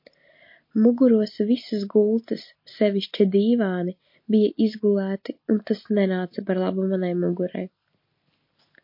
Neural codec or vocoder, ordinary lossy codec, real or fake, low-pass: none; MP3, 32 kbps; real; 5.4 kHz